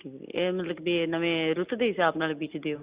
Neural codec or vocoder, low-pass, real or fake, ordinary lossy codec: none; 3.6 kHz; real; Opus, 64 kbps